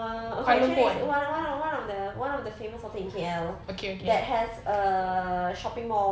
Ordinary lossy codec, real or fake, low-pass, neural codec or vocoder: none; real; none; none